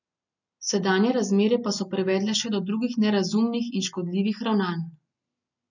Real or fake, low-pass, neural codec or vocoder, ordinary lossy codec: real; 7.2 kHz; none; none